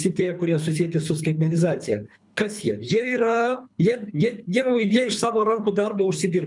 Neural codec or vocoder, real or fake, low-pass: codec, 24 kHz, 3 kbps, HILCodec; fake; 10.8 kHz